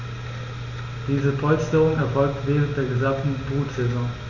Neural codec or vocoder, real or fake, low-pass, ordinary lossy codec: none; real; 7.2 kHz; Opus, 64 kbps